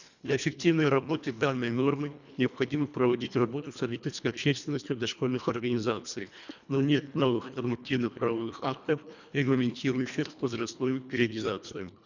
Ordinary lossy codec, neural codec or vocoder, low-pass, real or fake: none; codec, 24 kHz, 1.5 kbps, HILCodec; 7.2 kHz; fake